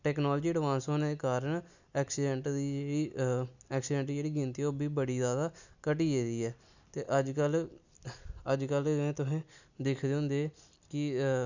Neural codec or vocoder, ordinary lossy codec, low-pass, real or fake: none; none; 7.2 kHz; real